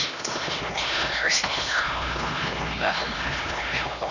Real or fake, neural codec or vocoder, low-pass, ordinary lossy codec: fake; codec, 16 kHz, 1 kbps, X-Codec, HuBERT features, trained on LibriSpeech; 7.2 kHz; none